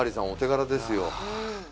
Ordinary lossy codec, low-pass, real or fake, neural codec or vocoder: none; none; real; none